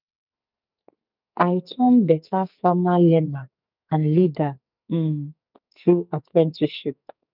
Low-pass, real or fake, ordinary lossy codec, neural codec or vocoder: 5.4 kHz; fake; none; codec, 44.1 kHz, 2.6 kbps, SNAC